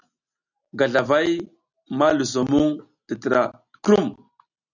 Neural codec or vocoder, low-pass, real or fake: none; 7.2 kHz; real